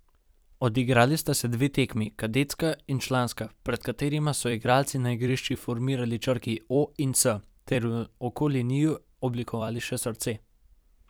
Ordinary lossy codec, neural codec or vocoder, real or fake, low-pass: none; vocoder, 44.1 kHz, 128 mel bands every 256 samples, BigVGAN v2; fake; none